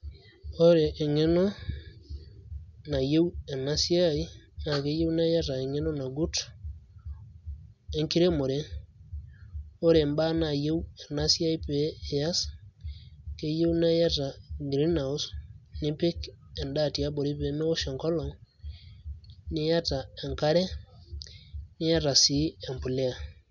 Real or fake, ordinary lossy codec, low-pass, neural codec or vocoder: real; none; 7.2 kHz; none